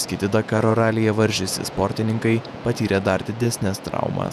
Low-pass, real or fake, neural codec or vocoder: 14.4 kHz; fake; vocoder, 48 kHz, 128 mel bands, Vocos